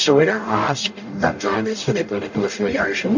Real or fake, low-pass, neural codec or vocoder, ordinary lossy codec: fake; 7.2 kHz; codec, 44.1 kHz, 0.9 kbps, DAC; AAC, 48 kbps